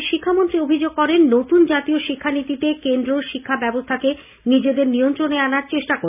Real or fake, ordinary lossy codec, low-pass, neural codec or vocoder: real; none; 3.6 kHz; none